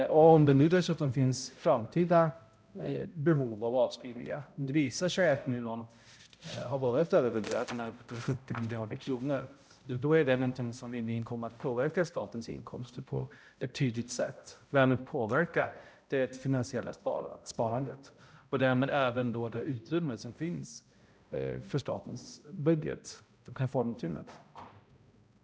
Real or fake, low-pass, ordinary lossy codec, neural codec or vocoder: fake; none; none; codec, 16 kHz, 0.5 kbps, X-Codec, HuBERT features, trained on balanced general audio